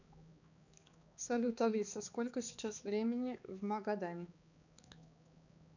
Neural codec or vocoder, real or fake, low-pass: codec, 16 kHz, 2 kbps, X-Codec, HuBERT features, trained on balanced general audio; fake; 7.2 kHz